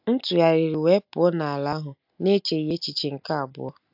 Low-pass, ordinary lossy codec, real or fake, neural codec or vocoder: 5.4 kHz; AAC, 48 kbps; real; none